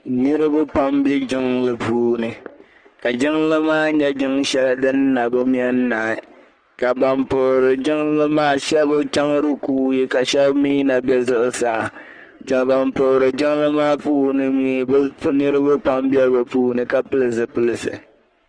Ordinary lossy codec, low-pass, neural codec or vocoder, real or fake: Opus, 24 kbps; 9.9 kHz; codec, 44.1 kHz, 3.4 kbps, Pupu-Codec; fake